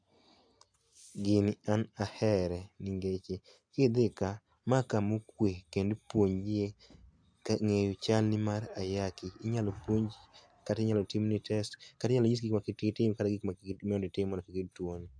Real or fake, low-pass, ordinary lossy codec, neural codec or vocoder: real; 9.9 kHz; AAC, 64 kbps; none